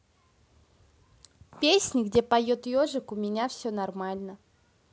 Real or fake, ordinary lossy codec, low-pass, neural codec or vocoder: real; none; none; none